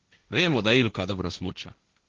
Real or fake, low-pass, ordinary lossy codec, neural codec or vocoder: fake; 7.2 kHz; Opus, 16 kbps; codec, 16 kHz, 1.1 kbps, Voila-Tokenizer